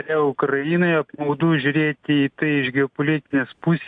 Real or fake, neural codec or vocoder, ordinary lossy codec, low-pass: real; none; AAC, 64 kbps; 9.9 kHz